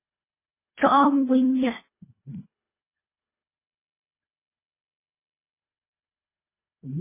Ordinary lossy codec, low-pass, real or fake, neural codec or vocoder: MP3, 16 kbps; 3.6 kHz; fake; codec, 24 kHz, 1.5 kbps, HILCodec